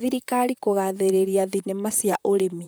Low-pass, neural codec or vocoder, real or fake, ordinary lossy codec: none; vocoder, 44.1 kHz, 128 mel bands, Pupu-Vocoder; fake; none